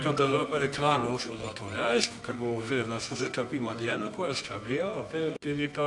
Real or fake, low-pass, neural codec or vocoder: fake; 10.8 kHz; codec, 24 kHz, 0.9 kbps, WavTokenizer, medium music audio release